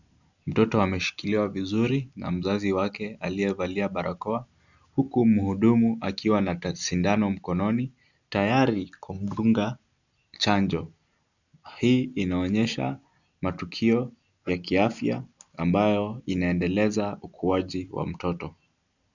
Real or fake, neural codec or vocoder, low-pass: real; none; 7.2 kHz